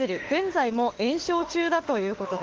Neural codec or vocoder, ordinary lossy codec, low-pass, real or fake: autoencoder, 48 kHz, 32 numbers a frame, DAC-VAE, trained on Japanese speech; Opus, 16 kbps; 7.2 kHz; fake